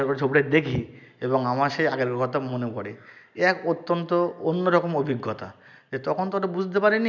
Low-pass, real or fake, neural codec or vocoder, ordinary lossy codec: 7.2 kHz; real; none; none